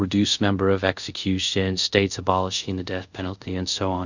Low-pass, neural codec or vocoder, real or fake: 7.2 kHz; codec, 24 kHz, 0.5 kbps, DualCodec; fake